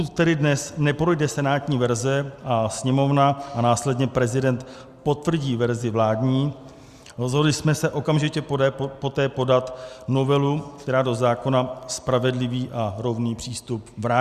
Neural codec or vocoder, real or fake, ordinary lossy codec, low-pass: none; real; Opus, 64 kbps; 14.4 kHz